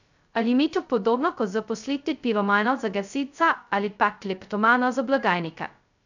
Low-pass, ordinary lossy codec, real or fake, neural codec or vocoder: 7.2 kHz; none; fake; codec, 16 kHz, 0.2 kbps, FocalCodec